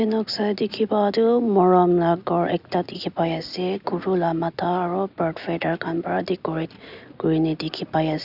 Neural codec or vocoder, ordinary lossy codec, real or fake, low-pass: none; none; real; 5.4 kHz